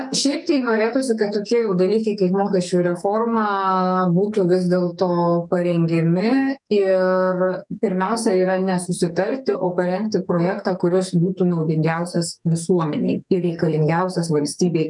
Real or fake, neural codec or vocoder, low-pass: fake; codec, 44.1 kHz, 2.6 kbps, SNAC; 10.8 kHz